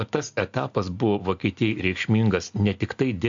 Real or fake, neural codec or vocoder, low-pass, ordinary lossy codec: real; none; 7.2 kHz; AAC, 64 kbps